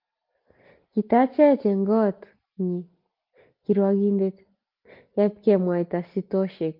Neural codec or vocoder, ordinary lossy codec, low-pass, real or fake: none; Opus, 32 kbps; 5.4 kHz; real